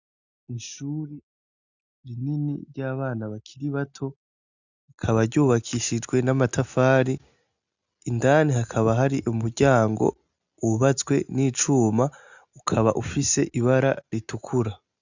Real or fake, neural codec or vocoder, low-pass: real; none; 7.2 kHz